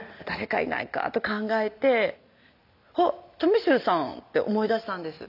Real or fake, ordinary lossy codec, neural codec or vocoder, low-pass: real; none; none; 5.4 kHz